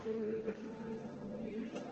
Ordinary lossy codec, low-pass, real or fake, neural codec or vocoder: Opus, 16 kbps; 7.2 kHz; fake; codec, 16 kHz, 1.1 kbps, Voila-Tokenizer